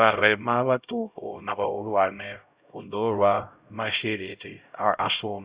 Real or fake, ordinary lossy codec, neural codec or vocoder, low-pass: fake; Opus, 64 kbps; codec, 16 kHz, 0.5 kbps, X-Codec, HuBERT features, trained on LibriSpeech; 3.6 kHz